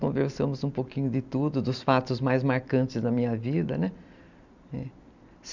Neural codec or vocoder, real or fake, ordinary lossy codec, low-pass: none; real; none; 7.2 kHz